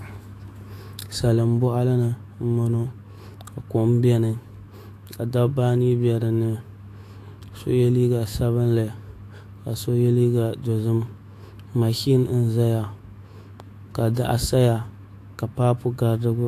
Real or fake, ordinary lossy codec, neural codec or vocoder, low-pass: fake; AAC, 64 kbps; autoencoder, 48 kHz, 128 numbers a frame, DAC-VAE, trained on Japanese speech; 14.4 kHz